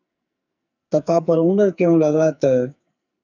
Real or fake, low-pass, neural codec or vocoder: fake; 7.2 kHz; codec, 44.1 kHz, 2.6 kbps, SNAC